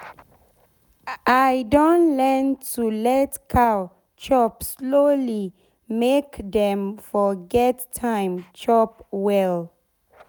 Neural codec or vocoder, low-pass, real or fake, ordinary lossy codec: none; none; real; none